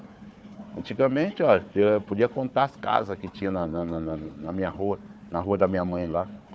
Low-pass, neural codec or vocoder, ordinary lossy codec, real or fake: none; codec, 16 kHz, 4 kbps, FunCodec, trained on Chinese and English, 50 frames a second; none; fake